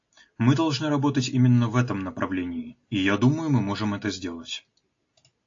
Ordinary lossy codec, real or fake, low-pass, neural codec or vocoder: AAC, 48 kbps; real; 7.2 kHz; none